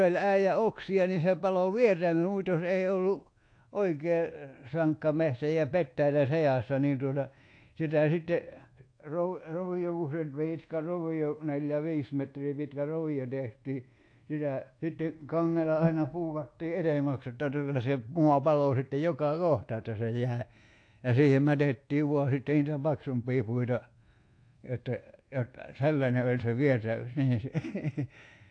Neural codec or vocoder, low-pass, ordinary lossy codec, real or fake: codec, 24 kHz, 1.2 kbps, DualCodec; 9.9 kHz; AAC, 48 kbps; fake